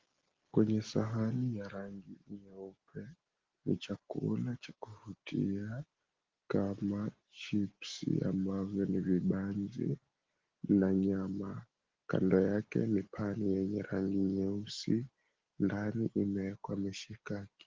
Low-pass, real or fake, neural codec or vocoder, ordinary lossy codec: 7.2 kHz; real; none; Opus, 16 kbps